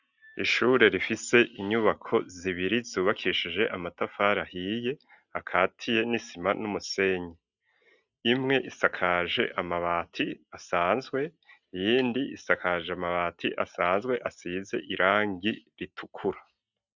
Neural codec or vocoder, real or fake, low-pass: none; real; 7.2 kHz